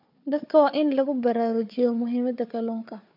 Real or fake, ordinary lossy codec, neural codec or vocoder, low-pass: fake; MP3, 48 kbps; codec, 16 kHz, 4 kbps, FunCodec, trained on Chinese and English, 50 frames a second; 5.4 kHz